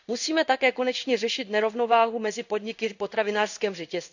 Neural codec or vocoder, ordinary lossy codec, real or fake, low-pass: codec, 16 kHz in and 24 kHz out, 1 kbps, XY-Tokenizer; none; fake; 7.2 kHz